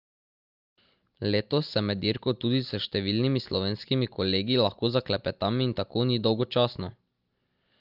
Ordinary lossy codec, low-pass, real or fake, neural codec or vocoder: Opus, 24 kbps; 5.4 kHz; real; none